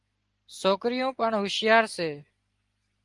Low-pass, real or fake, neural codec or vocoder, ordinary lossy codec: 10.8 kHz; real; none; Opus, 24 kbps